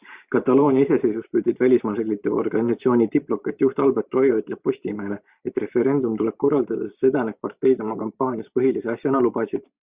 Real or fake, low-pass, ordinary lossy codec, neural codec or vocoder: fake; 3.6 kHz; Opus, 64 kbps; vocoder, 44.1 kHz, 128 mel bands every 512 samples, BigVGAN v2